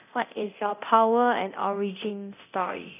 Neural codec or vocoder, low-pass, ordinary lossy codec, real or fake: codec, 24 kHz, 0.9 kbps, DualCodec; 3.6 kHz; none; fake